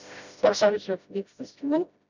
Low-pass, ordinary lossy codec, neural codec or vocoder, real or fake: 7.2 kHz; none; codec, 16 kHz, 0.5 kbps, FreqCodec, smaller model; fake